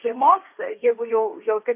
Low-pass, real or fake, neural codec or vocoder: 3.6 kHz; fake; codec, 16 kHz, 1.1 kbps, Voila-Tokenizer